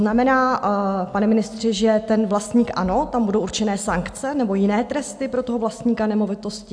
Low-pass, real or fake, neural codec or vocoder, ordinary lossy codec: 9.9 kHz; real; none; AAC, 64 kbps